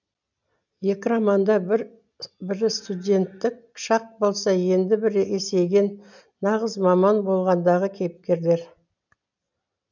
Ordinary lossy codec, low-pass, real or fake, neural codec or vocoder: none; 7.2 kHz; real; none